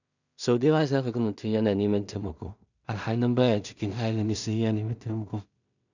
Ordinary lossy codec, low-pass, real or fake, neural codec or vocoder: none; 7.2 kHz; fake; codec, 16 kHz in and 24 kHz out, 0.4 kbps, LongCat-Audio-Codec, two codebook decoder